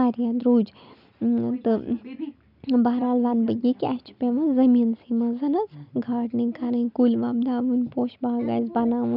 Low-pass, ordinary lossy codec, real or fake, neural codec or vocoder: 5.4 kHz; none; real; none